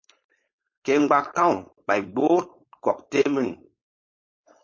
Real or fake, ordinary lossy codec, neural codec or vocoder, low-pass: fake; MP3, 32 kbps; codec, 16 kHz, 4.8 kbps, FACodec; 7.2 kHz